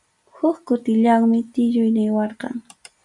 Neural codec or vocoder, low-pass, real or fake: none; 10.8 kHz; real